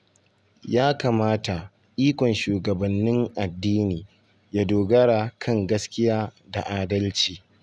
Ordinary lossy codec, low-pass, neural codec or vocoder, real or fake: none; none; none; real